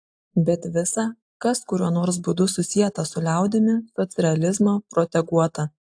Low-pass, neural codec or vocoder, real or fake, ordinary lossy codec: 9.9 kHz; none; real; AAC, 64 kbps